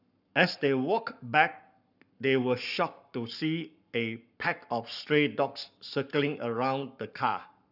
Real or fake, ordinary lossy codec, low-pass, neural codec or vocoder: fake; none; 5.4 kHz; codec, 44.1 kHz, 7.8 kbps, Pupu-Codec